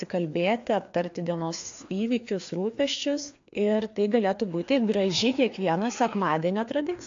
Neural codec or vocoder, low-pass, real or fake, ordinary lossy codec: codec, 16 kHz, 2 kbps, FreqCodec, larger model; 7.2 kHz; fake; AAC, 48 kbps